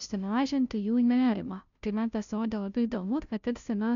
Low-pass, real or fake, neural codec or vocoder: 7.2 kHz; fake; codec, 16 kHz, 0.5 kbps, FunCodec, trained on Chinese and English, 25 frames a second